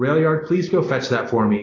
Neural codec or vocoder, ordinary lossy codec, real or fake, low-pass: none; AAC, 32 kbps; real; 7.2 kHz